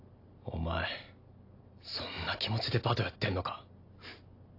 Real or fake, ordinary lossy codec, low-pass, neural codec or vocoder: real; AAC, 32 kbps; 5.4 kHz; none